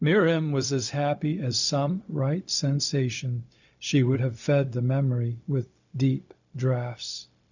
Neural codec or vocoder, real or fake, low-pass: codec, 16 kHz, 0.4 kbps, LongCat-Audio-Codec; fake; 7.2 kHz